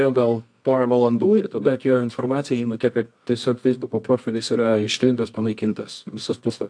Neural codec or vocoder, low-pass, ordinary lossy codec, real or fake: codec, 24 kHz, 0.9 kbps, WavTokenizer, medium music audio release; 9.9 kHz; AAC, 64 kbps; fake